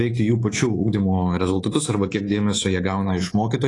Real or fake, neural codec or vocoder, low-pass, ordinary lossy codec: fake; codec, 24 kHz, 3.1 kbps, DualCodec; 10.8 kHz; AAC, 32 kbps